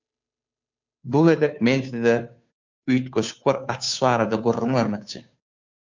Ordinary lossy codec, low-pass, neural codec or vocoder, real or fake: MP3, 64 kbps; 7.2 kHz; codec, 16 kHz, 2 kbps, FunCodec, trained on Chinese and English, 25 frames a second; fake